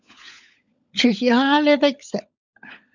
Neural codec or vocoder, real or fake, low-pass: codec, 16 kHz, 16 kbps, FunCodec, trained on LibriTTS, 50 frames a second; fake; 7.2 kHz